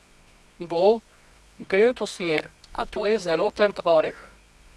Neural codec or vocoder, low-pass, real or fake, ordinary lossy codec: codec, 24 kHz, 0.9 kbps, WavTokenizer, medium music audio release; none; fake; none